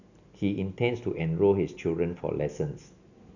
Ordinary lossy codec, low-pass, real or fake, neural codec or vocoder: none; 7.2 kHz; real; none